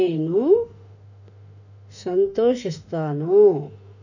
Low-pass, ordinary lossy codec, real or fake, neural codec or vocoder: 7.2 kHz; none; fake; autoencoder, 48 kHz, 32 numbers a frame, DAC-VAE, trained on Japanese speech